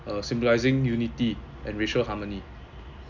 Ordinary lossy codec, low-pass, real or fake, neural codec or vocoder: none; 7.2 kHz; real; none